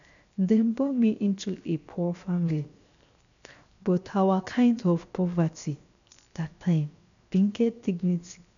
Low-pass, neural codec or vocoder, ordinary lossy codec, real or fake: 7.2 kHz; codec, 16 kHz, 0.7 kbps, FocalCodec; none; fake